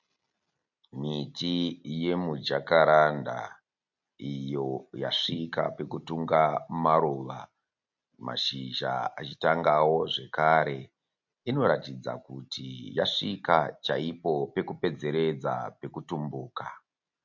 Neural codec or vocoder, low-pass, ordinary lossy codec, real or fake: none; 7.2 kHz; MP3, 48 kbps; real